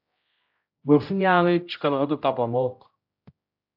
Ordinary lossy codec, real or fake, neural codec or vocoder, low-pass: AAC, 48 kbps; fake; codec, 16 kHz, 0.5 kbps, X-Codec, HuBERT features, trained on general audio; 5.4 kHz